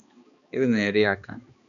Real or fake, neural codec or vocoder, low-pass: fake; codec, 16 kHz, 2 kbps, X-Codec, HuBERT features, trained on balanced general audio; 7.2 kHz